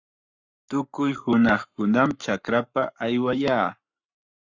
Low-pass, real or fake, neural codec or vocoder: 7.2 kHz; fake; codec, 44.1 kHz, 7.8 kbps, Pupu-Codec